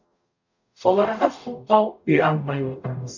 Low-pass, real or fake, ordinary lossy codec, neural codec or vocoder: 7.2 kHz; fake; none; codec, 44.1 kHz, 0.9 kbps, DAC